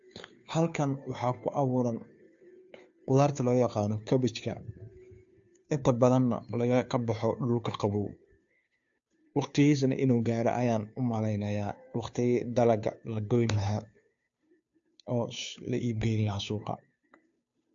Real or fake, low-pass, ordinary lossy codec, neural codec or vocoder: fake; 7.2 kHz; AAC, 64 kbps; codec, 16 kHz, 2 kbps, FunCodec, trained on Chinese and English, 25 frames a second